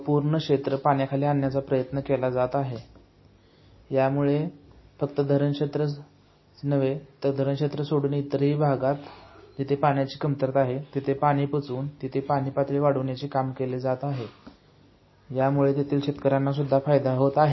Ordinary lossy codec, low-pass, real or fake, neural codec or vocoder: MP3, 24 kbps; 7.2 kHz; real; none